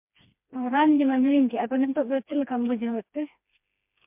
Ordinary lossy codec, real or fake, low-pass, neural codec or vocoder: MP3, 32 kbps; fake; 3.6 kHz; codec, 16 kHz, 2 kbps, FreqCodec, smaller model